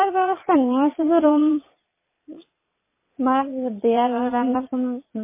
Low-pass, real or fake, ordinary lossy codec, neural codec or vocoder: 3.6 kHz; fake; MP3, 16 kbps; vocoder, 22.05 kHz, 80 mel bands, Vocos